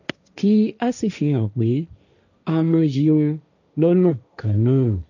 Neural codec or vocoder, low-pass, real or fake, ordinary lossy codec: codec, 16 kHz, 1.1 kbps, Voila-Tokenizer; 7.2 kHz; fake; none